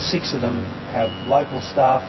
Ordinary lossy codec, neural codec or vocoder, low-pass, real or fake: MP3, 24 kbps; vocoder, 24 kHz, 100 mel bands, Vocos; 7.2 kHz; fake